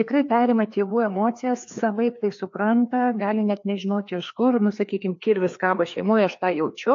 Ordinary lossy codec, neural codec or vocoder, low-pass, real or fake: AAC, 48 kbps; codec, 16 kHz, 2 kbps, FreqCodec, larger model; 7.2 kHz; fake